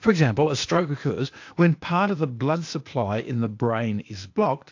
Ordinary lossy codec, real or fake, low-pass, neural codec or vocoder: AAC, 48 kbps; fake; 7.2 kHz; codec, 16 kHz, 0.8 kbps, ZipCodec